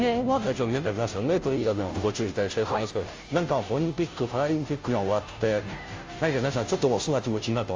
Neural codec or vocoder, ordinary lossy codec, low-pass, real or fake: codec, 16 kHz, 0.5 kbps, FunCodec, trained on Chinese and English, 25 frames a second; Opus, 32 kbps; 7.2 kHz; fake